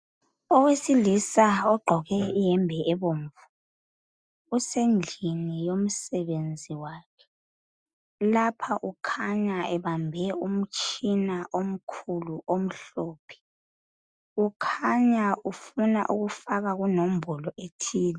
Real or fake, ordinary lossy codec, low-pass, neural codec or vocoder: real; Opus, 64 kbps; 9.9 kHz; none